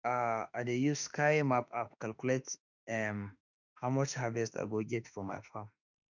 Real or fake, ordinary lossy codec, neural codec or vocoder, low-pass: fake; none; autoencoder, 48 kHz, 32 numbers a frame, DAC-VAE, trained on Japanese speech; 7.2 kHz